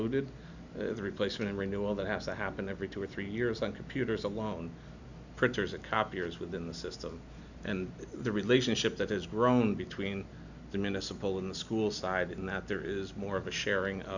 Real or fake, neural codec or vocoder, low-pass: real; none; 7.2 kHz